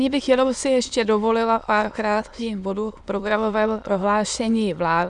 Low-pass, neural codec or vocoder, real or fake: 9.9 kHz; autoencoder, 22.05 kHz, a latent of 192 numbers a frame, VITS, trained on many speakers; fake